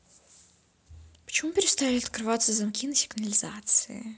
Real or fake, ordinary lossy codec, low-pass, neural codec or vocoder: real; none; none; none